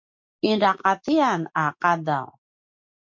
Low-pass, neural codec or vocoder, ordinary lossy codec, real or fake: 7.2 kHz; none; MP3, 64 kbps; real